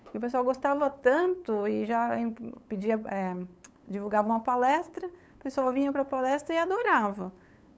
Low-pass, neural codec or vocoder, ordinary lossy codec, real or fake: none; codec, 16 kHz, 8 kbps, FunCodec, trained on LibriTTS, 25 frames a second; none; fake